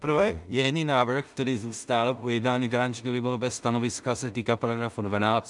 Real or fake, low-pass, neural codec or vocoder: fake; 10.8 kHz; codec, 16 kHz in and 24 kHz out, 0.4 kbps, LongCat-Audio-Codec, two codebook decoder